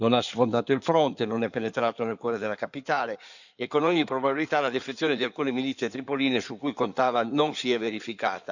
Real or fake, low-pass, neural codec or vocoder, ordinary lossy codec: fake; 7.2 kHz; codec, 16 kHz in and 24 kHz out, 2.2 kbps, FireRedTTS-2 codec; none